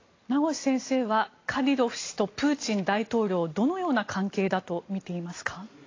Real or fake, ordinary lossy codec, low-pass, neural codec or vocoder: real; AAC, 32 kbps; 7.2 kHz; none